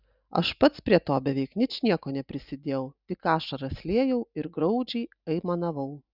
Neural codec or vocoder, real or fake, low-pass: none; real; 5.4 kHz